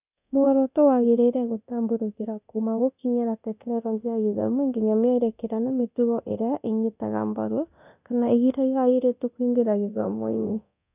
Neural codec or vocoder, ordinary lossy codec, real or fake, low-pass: codec, 24 kHz, 0.9 kbps, DualCodec; none; fake; 3.6 kHz